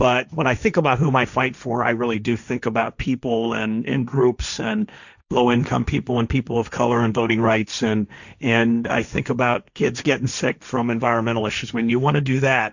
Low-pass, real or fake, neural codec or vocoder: 7.2 kHz; fake; codec, 16 kHz, 1.1 kbps, Voila-Tokenizer